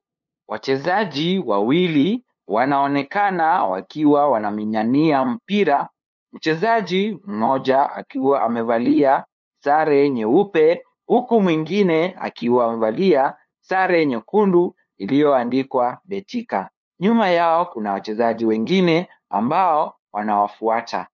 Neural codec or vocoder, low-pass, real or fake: codec, 16 kHz, 2 kbps, FunCodec, trained on LibriTTS, 25 frames a second; 7.2 kHz; fake